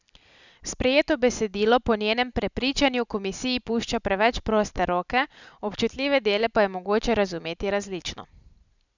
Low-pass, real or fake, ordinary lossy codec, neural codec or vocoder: 7.2 kHz; real; none; none